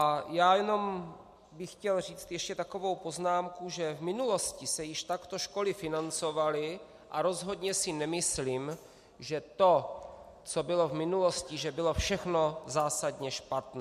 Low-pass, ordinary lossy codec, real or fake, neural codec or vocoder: 14.4 kHz; MP3, 64 kbps; real; none